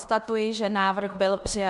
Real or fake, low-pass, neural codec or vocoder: fake; 10.8 kHz; codec, 16 kHz in and 24 kHz out, 0.9 kbps, LongCat-Audio-Codec, fine tuned four codebook decoder